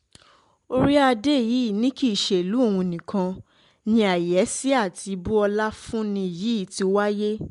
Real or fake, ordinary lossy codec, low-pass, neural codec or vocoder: real; MP3, 64 kbps; 10.8 kHz; none